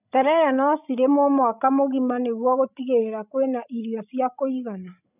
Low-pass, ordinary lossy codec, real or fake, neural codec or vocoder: 3.6 kHz; none; fake; codec, 16 kHz, 16 kbps, FreqCodec, larger model